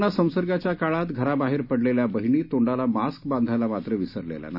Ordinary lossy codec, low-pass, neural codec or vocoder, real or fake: none; 5.4 kHz; none; real